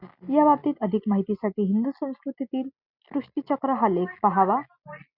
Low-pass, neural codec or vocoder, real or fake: 5.4 kHz; none; real